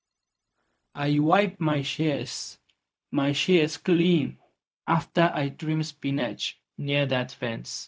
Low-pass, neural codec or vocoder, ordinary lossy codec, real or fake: none; codec, 16 kHz, 0.4 kbps, LongCat-Audio-Codec; none; fake